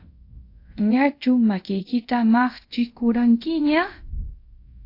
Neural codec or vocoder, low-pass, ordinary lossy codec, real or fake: codec, 24 kHz, 0.5 kbps, DualCodec; 5.4 kHz; AAC, 32 kbps; fake